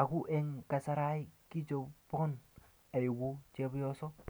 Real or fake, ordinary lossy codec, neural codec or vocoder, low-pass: real; none; none; none